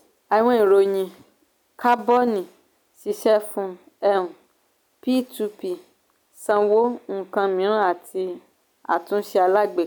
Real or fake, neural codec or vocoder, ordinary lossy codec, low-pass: real; none; none; none